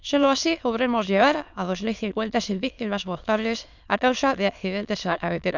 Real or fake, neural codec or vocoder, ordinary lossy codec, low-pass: fake; autoencoder, 22.05 kHz, a latent of 192 numbers a frame, VITS, trained on many speakers; none; 7.2 kHz